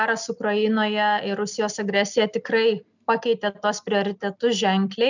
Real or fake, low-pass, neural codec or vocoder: real; 7.2 kHz; none